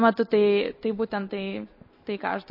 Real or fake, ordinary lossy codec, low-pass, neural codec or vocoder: real; MP3, 24 kbps; 5.4 kHz; none